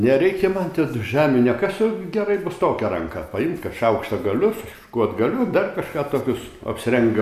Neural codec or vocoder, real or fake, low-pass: none; real; 14.4 kHz